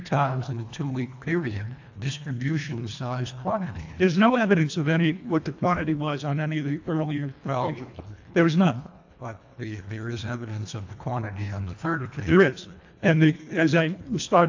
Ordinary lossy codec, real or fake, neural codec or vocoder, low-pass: AAC, 48 kbps; fake; codec, 24 kHz, 1.5 kbps, HILCodec; 7.2 kHz